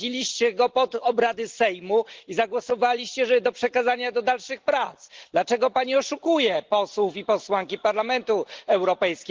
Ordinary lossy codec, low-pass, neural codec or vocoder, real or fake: Opus, 16 kbps; 7.2 kHz; none; real